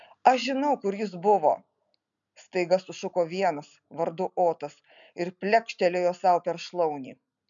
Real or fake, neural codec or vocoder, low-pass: real; none; 7.2 kHz